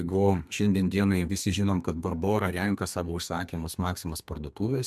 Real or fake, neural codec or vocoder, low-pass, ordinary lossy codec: fake; codec, 32 kHz, 1.9 kbps, SNAC; 14.4 kHz; MP3, 96 kbps